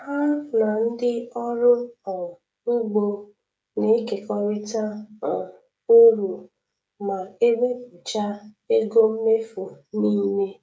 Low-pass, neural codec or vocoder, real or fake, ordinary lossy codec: none; codec, 16 kHz, 16 kbps, FreqCodec, smaller model; fake; none